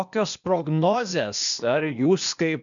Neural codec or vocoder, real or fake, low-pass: codec, 16 kHz, 0.8 kbps, ZipCodec; fake; 7.2 kHz